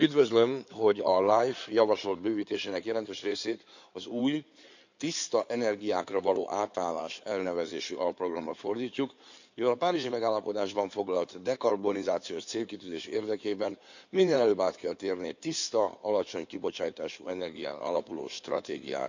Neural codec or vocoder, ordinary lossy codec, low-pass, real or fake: codec, 16 kHz in and 24 kHz out, 2.2 kbps, FireRedTTS-2 codec; none; 7.2 kHz; fake